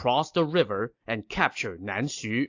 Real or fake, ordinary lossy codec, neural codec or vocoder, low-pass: real; AAC, 48 kbps; none; 7.2 kHz